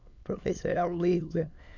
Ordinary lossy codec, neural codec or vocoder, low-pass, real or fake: none; autoencoder, 22.05 kHz, a latent of 192 numbers a frame, VITS, trained on many speakers; 7.2 kHz; fake